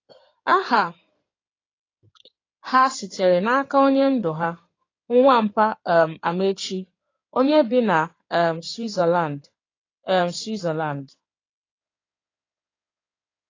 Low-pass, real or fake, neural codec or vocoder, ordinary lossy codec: 7.2 kHz; fake; codec, 16 kHz in and 24 kHz out, 2.2 kbps, FireRedTTS-2 codec; AAC, 32 kbps